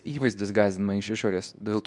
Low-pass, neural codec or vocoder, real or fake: 10.8 kHz; codec, 24 kHz, 0.9 kbps, WavTokenizer, medium speech release version 2; fake